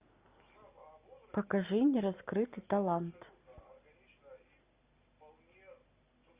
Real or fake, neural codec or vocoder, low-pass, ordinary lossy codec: real; none; 3.6 kHz; Opus, 64 kbps